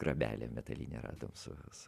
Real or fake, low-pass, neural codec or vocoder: real; 14.4 kHz; none